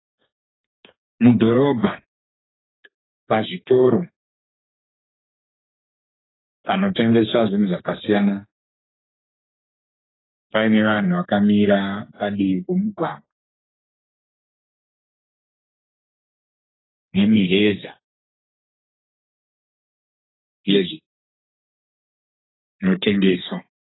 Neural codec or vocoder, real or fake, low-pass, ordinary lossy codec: codec, 32 kHz, 1.9 kbps, SNAC; fake; 7.2 kHz; AAC, 16 kbps